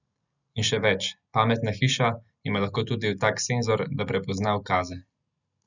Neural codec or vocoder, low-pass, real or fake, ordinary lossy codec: none; 7.2 kHz; real; none